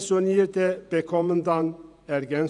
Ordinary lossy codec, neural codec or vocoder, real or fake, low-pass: none; vocoder, 48 kHz, 128 mel bands, Vocos; fake; 10.8 kHz